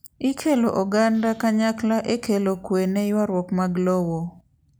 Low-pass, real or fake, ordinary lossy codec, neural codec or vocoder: none; real; none; none